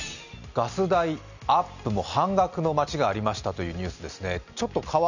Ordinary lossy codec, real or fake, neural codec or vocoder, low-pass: none; real; none; 7.2 kHz